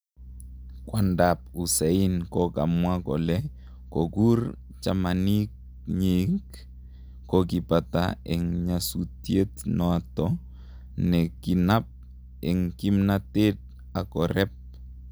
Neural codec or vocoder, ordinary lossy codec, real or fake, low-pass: none; none; real; none